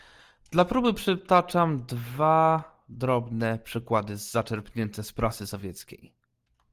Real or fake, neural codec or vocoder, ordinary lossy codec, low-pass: real; none; Opus, 24 kbps; 14.4 kHz